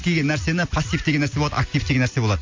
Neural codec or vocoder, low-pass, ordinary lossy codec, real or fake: none; 7.2 kHz; MP3, 48 kbps; real